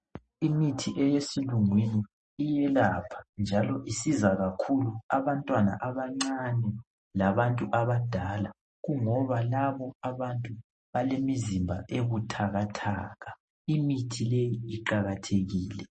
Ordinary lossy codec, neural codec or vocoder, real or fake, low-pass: MP3, 32 kbps; none; real; 10.8 kHz